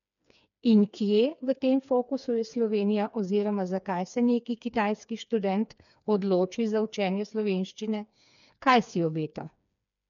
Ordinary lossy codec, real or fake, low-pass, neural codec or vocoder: none; fake; 7.2 kHz; codec, 16 kHz, 4 kbps, FreqCodec, smaller model